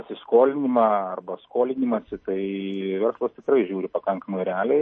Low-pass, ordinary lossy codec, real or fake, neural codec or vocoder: 9.9 kHz; MP3, 32 kbps; real; none